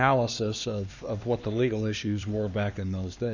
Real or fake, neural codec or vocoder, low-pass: fake; codec, 16 kHz, 2 kbps, X-Codec, HuBERT features, trained on LibriSpeech; 7.2 kHz